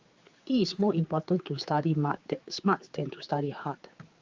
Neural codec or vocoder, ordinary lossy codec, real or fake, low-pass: codec, 16 kHz, 4 kbps, X-Codec, HuBERT features, trained on general audio; Opus, 32 kbps; fake; 7.2 kHz